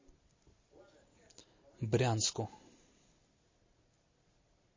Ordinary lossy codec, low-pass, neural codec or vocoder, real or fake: MP3, 32 kbps; 7.2 kHz; none; real